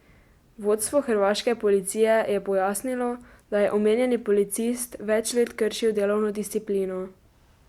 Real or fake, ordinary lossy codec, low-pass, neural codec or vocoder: real; Opus, 64 kbps; 19.8 kHz; none